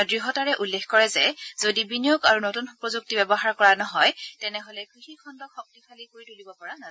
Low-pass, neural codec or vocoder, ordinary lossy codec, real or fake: none; none; none; real